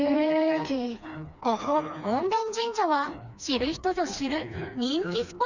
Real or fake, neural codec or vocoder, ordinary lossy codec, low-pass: fake; codec, 16 kHz, 2 kbps, FreqCodec, smaller model; none; 7.2 kHz